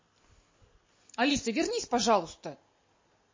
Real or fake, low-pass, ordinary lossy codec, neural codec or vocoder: real; 7.2 kHz; MP3, 32 kbps; none